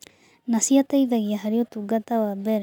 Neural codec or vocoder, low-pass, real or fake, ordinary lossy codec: none; 19.8 kHz; real; none